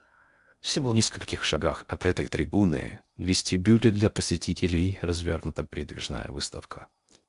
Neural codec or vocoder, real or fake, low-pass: codec, 16 kHz in and 24 kHz out, 0.6 kbps, FocalCodec, streaming, 4096 codes; fake; 10.8 kHz